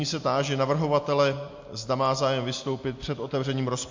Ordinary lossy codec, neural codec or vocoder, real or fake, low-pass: MP3, 48 kbps; none; real; 7.2 kHz